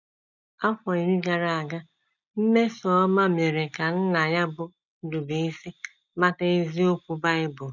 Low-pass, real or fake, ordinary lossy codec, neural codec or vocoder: 7.2 kHz; real; none; none